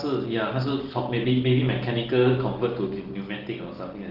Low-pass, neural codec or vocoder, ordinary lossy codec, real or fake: 5.4 kHz; none; Opus, 16 kbps; real